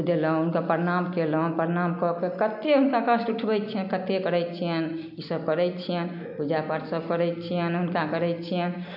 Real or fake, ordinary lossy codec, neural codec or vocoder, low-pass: real; none; none; 5.4 kHz